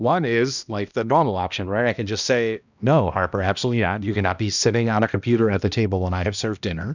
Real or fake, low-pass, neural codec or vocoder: fake; 7.2 kHz; codec, 16 kHz, 1 kbps, X-Codec, HuBERT features, trained on balanced general audio